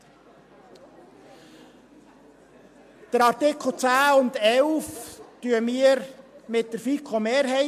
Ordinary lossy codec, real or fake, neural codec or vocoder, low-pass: MP3, 64 kbps; real; none; 14.4 kHz